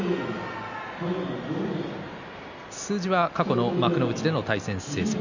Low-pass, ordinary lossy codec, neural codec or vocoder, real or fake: 7.2 kHz; none; none; real